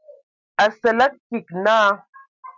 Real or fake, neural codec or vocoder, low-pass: real; none; 7.2 kHz